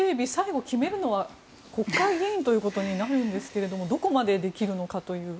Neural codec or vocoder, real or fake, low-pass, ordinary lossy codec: none; real; none; none